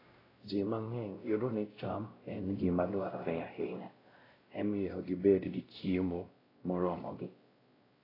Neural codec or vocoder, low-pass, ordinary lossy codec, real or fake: codec, 16 kHz, 0.5 kbps, X-Codec, WavLM features, trained on Multilingual LibriSpeech; 5.4 kHz; AAC, 24 kbps; fake